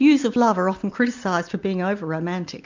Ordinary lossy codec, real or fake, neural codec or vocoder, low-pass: MP3, 64 kbps; real; none; 7.2 kHz